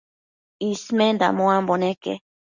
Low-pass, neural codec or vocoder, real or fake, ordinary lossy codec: 7.2 kHz; none; real; Opus, 64 kbps